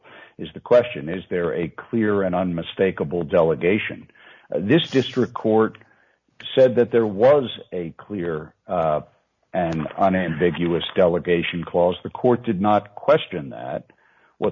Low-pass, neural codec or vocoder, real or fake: 7.2 kHz; none; real